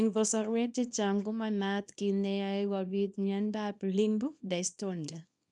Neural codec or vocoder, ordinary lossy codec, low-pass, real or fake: codec, 24 kHz, 0.9 kbps, WavTokenizer, small release; none; 10.8 kHz; fake